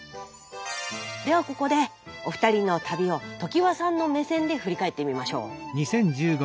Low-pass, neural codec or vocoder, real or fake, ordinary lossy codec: none; none; real; none